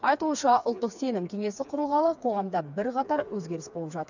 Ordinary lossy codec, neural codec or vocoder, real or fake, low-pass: none; codec, 16 kHz, 4 kbps, FreqCodec, smaller model; fake; 7.2 kHz